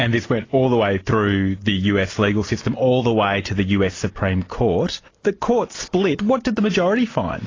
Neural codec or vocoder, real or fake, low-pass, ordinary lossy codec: none; real; 7.2 kHz; AAC, 32 kbps